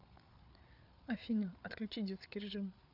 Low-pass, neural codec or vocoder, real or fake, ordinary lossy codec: 5.4 kHz; codec, 16 kHz, 8 kbps, FreqCodec, larger model; fake; none